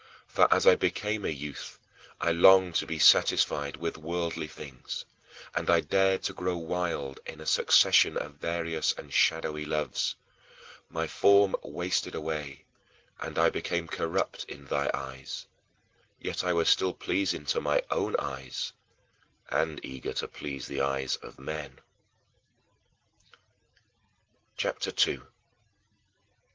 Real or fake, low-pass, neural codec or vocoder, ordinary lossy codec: real; 7.2 kHz; none; Opus, 16 kbps